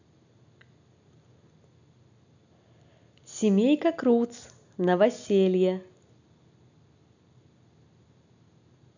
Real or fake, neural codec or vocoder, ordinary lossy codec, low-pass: real; none; none; 7.2 kHz